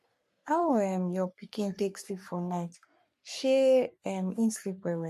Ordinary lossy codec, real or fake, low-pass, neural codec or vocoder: MP3, 64 kbps; fake; 14.4 kHz; codec, 44.1 kHz, 3.4 kbps, Pupu-Codec